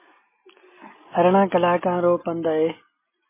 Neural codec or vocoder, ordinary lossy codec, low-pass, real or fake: none; MP3, 16 kbps; 3.6 kHz; real